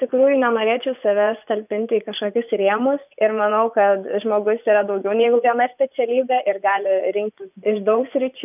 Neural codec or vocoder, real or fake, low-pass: vocoder, 24 kHz, 100 mel bands, Vocos; fake; 3.6 kHz